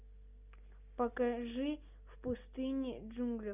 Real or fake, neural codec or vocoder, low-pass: real; none; 3.6 kHz